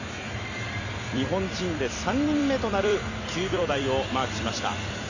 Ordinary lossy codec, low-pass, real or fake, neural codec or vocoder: none; 7.2 kHz; fake; vocoder, 44.1 kHz, 128 mel bands every 256 samples, BigVGAN v2